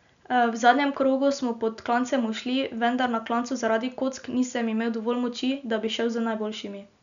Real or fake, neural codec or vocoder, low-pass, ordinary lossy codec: real; none; 7.2 kHz; none